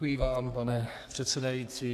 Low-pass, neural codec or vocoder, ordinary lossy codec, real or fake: 14.4 kHz; codec, 32 kHz, 1.9 kbps, SNAC; AAC, 64 kbps; fake